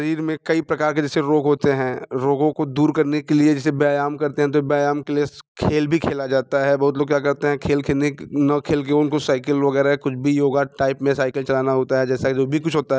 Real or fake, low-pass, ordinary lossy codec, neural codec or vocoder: real; none; none; none